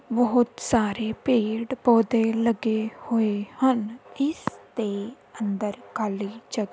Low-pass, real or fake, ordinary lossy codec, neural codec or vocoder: none; real; none; none